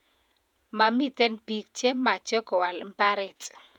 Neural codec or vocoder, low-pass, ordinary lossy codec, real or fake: vocoder, 48 kHz, 128 mel bands, Vocos; 19.8 kHz; none; fake